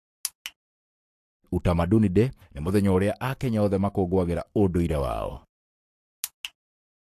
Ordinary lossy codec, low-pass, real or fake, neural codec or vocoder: AAC, 64 kbps; 14.4 kHz; fake; codec, 44.1 kHz, 7.8 kbps, Pupu-Codec